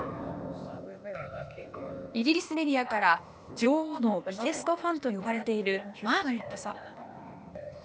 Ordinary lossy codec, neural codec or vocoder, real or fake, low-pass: none; codec, 16 kHz, 0.8 kbps, ZipCodec; fake; none